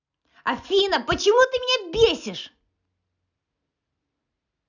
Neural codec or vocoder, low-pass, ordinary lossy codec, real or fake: none; 7.2 kHz; none; real